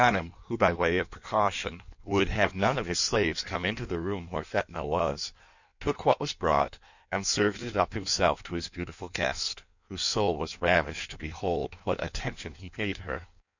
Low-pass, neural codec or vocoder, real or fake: 7.2 kHz; codec, 16 kHz in and 24 kHz out, 1.1 kbps, FireRedTTS-2 codec; fake